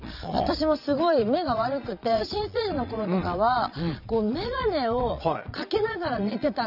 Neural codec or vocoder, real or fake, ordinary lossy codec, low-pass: vocoder, 22.05 kHz, 80 mel bands, Vocos; fake; none; 5.4 kHz